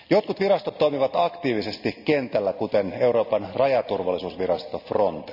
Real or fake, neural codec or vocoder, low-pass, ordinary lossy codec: real; none; 5.4 kHz; none